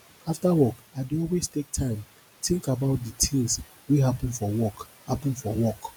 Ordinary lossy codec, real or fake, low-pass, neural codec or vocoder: none; real; none; none